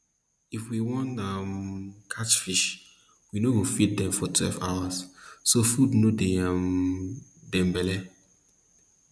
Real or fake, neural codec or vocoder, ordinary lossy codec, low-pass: real; none; none; none